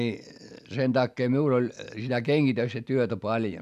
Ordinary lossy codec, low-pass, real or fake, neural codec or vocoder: none; 14.4 kHz; real; none